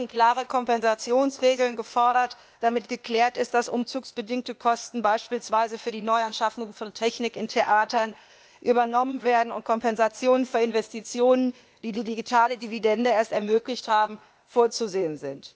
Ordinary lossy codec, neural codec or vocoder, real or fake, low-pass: none; codec, 16 kHz, 0.8 kbps, ZipCodec; fake; none